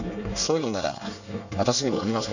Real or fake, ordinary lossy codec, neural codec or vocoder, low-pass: fake; none; codec, 24 kHz, 1 kbps, SNAC; 7.2 kHz